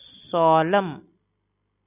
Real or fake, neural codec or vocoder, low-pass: real; none; 3.6 kHz